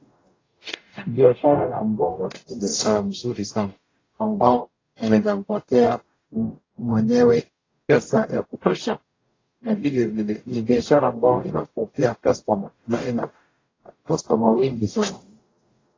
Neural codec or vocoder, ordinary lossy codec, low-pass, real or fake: codec, 44.1 kHz, 0.9 kbps, DAC; AAC, 32 kbps; 7.2 kHz; fake